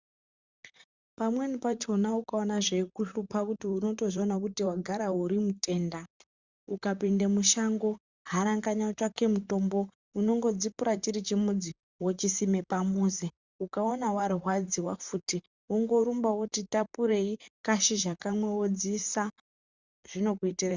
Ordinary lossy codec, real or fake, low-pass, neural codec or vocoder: Opus, 64 kbps; fake; 7.2 kHz; vocoder, 44.1 kHz, 128 mel bands, Pupu-Vocoder